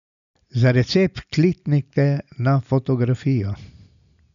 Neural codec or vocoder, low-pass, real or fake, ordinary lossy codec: none; 7.2 kHz; real; none